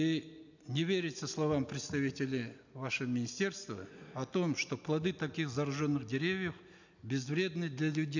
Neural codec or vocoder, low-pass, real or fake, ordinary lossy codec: none; 7.2 kHz; real; none